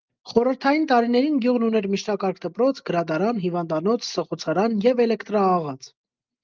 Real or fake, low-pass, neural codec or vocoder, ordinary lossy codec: real; 7.2 kHz; none; Opus, 24 kbps